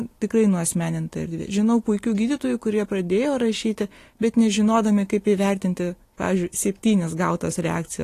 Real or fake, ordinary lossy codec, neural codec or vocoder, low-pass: real; AAC, 48 kbps; none; 14.4 kHz